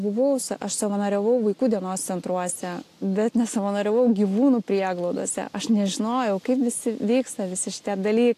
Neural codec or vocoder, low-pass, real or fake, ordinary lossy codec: none; 14.4 kHz; real; AAC, 64 kbps